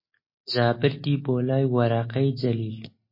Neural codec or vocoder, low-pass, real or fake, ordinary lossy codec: none; 5.4 kHz; real; MP3, 24 kbps